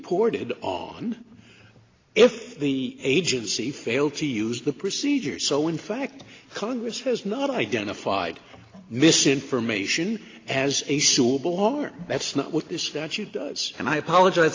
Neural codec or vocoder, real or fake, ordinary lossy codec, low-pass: none; real; AAC, 32 kbps; 7.2 kHz